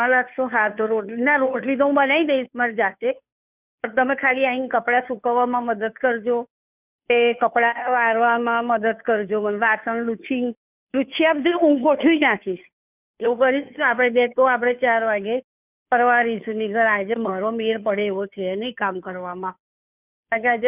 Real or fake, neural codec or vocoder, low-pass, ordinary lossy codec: fake; codec, 16 kHz, 2 kbps, FunCodec, trained on Chinese and English, 25 frames a second; 3.6 kHz; none